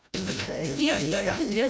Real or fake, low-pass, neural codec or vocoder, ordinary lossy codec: fake; none; codec, 16 kHz, 0.5 kbps, FreqCodec, larger model; none